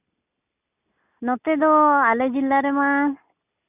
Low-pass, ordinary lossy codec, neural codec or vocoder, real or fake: 3.6 kHz; none; none; real